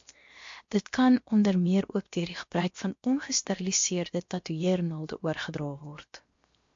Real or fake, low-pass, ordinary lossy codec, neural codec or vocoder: fake; 7.2 kHz; MP3, 48 kbps; codec, 16 kHz, 0.7 kbps, FocalCodec